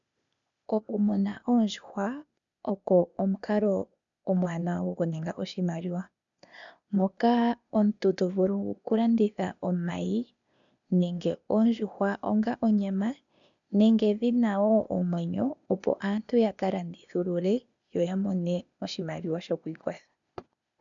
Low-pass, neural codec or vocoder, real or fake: 7.2 kHz; codec, 16 kHz, 0.8 kbps, ZipCodec; fake